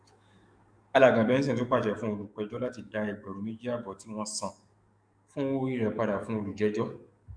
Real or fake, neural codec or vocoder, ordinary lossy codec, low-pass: fake; codec, 44.1 kHz, 7.8 kbps, DAC; none; 9.9 kHz